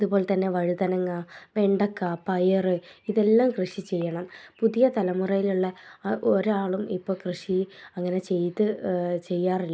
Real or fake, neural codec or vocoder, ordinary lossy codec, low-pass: real; none; none; none